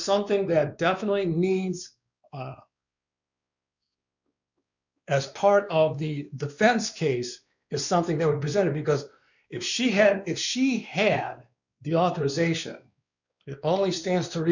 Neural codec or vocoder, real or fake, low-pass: codec, 16 kHz, 2 kbps, X-Codec, WavLM features, trained on Multilingual LibriSpeech; fake; 7.2 kHz